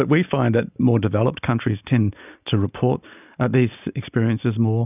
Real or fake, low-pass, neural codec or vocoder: real; 3.6 kHz; none